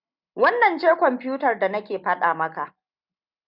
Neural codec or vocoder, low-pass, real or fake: none; 5.4 kHz; real